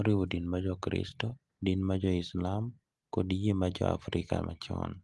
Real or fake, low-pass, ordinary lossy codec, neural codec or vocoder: real; 10.8 kHz; Opus, 24 kbps; none